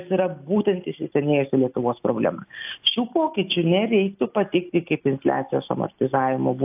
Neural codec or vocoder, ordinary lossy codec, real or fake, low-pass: none; AAC, 32 kbps; real; 3.6 kHz